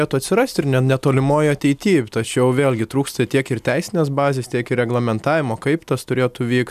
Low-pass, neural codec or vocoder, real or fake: 14.4 kHz; none; real